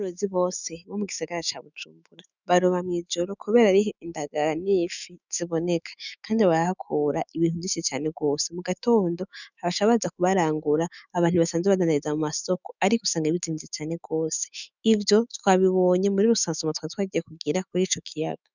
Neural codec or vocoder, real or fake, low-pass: autoencoder, 48 kHz, 128 numbers a frame, DAC-VAE, trained on Japanese speech; fake; 7.2 kHz